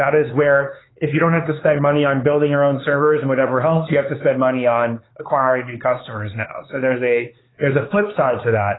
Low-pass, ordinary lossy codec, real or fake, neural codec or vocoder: 7.2 kHz; AAC, 16 kbps; fake; codec, 16 kHz, 4 kbps, X-Codec, HuBERT features, trained on LibriSpeech